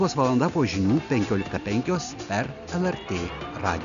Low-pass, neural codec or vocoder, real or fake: 7.2 kHz; none; real